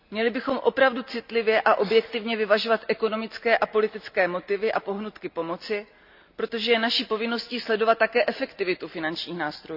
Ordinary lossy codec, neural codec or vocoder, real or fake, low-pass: none; none; real; 5.4 kHz